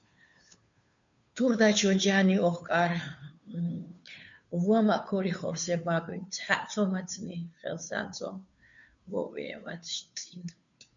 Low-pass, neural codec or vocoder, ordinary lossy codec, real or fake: 7.2 kHz; codec, 16 kHz, 2 kbps, FunCodec, trained on Chinese and English, 25 frames a second; MP3, 64 kbps; fake